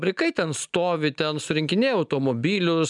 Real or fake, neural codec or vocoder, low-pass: real; none; 10.8 kHz